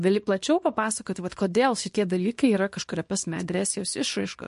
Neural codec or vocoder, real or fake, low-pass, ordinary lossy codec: codec, 24 kHz, 0.9 kbps, WavTokenizer, small release; fake; 10.8 kHz; MP3, 48 kbps